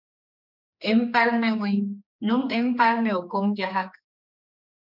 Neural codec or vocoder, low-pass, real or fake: codec, 16 kHz, 2 kbps, X-Codec, HuBERT features, trained on general audio; 5.4 kHz; fake